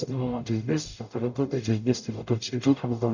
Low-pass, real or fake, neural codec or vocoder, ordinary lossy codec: 7.2 kHz; fake; codec, 44.1 kHz, 0.9 kbps, DAC; none